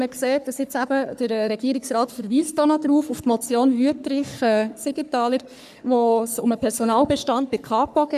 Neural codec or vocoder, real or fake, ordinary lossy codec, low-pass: codec, 44.1 kHz, 3.4 kbps, Pupu-Codec; fake; none; 14.4 kHz